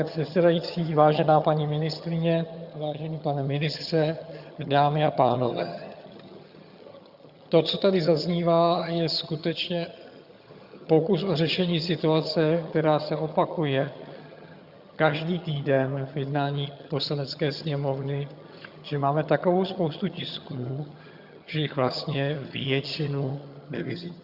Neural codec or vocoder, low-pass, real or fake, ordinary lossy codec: vocoder, 22.05 kHz, 80 mel bands, HiFi-GAN; 5.4 kHz; fake; Opus, 64 kbps